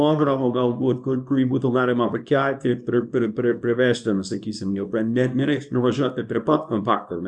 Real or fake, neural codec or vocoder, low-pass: fake; codec, 24 kHz, 0.9 kbps, WavTokenizer, small release; 10.8 kHz